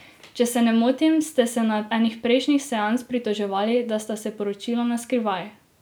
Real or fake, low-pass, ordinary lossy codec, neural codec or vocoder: real; none; none; none